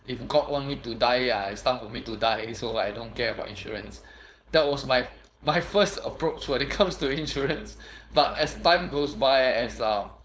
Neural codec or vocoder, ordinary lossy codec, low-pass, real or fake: codec, 16 kHz, 4.8 kbps, FACodec; none; none; fake